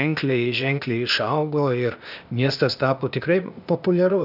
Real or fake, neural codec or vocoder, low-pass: fake; codec, 16 kHz, 0.8 kbps, ZipCodec; 5.4 kHz